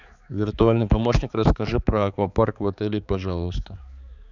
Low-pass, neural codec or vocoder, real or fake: 7.2 kHz; codec, 16 kHz, 4 kbps, X-Codec, HuBERT features, trained on balanced general audio; fake